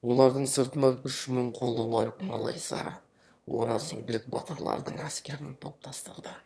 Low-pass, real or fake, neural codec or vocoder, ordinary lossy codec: none; fake; autoencoder, 22.05 kHz, a latent of 192 numbers a frame, VITS, trained on one speaker; none